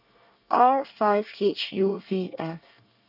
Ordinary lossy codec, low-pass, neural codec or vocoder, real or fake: none; 5.4 kHz; codec, 24 kHz, 1 kbps, SNAC; fake